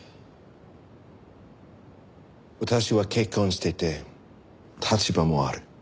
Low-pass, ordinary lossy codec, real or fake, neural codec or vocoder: none; none; real; none